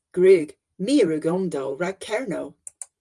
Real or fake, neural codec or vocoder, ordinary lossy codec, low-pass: fake; vocoder, 44.1 kHz, 128 mel bands every 512 samples, BigVGAN v2; Opus, 32 kbps; 10.8 kHz